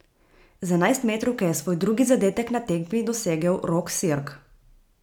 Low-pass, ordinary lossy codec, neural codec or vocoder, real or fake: 19.8 kHz; none; none; real